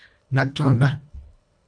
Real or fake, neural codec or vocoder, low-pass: fake; codec, 24 kHz, 1.5 kbps, HILCodec; 9.9 kHz